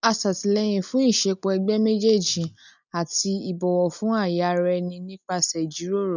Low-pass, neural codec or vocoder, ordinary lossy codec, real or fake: 7.2 kHz; none; none; real